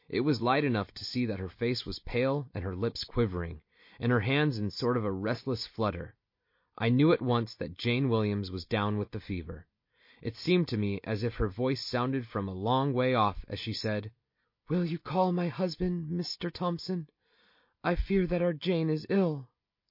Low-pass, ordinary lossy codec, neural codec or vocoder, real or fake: 5.4 kHz; MP3, 32 kbps; none; real